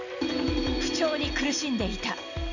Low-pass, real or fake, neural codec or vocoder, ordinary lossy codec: 7.2 kHz; real; none; none